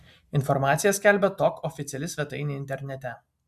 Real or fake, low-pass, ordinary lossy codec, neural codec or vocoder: real; 14.4 kHz; MP3, 96 kbps; none